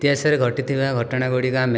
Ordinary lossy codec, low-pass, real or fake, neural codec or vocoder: none; none; real; none